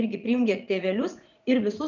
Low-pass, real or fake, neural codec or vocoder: 7.2 kHz; fake; vocoder, 44.1 kHz, 80 mel bands, Vocos